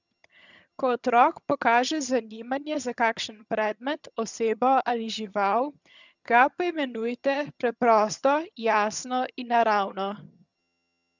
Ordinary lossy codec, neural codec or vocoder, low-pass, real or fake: none; vocoder, 22.05 kHz, 80 mel bands, HiFi-GAN; 7.2 kHz; fake